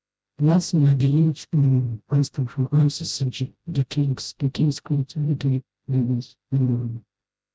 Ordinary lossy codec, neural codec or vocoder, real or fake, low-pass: none; codec, 16 kHz, 0.5 kbps, FreqCodec, smaller model; fake; none